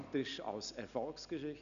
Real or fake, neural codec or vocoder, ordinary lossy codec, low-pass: real; none; none; 7.2 kHz